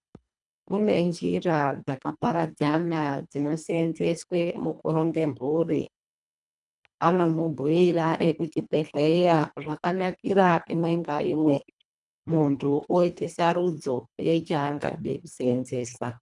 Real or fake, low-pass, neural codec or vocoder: fake; 10.8 kHz; codec, 24 kHz, 1.5 kbps, HILCodec